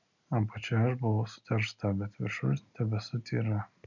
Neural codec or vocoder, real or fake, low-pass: none; real; 7.2 kHz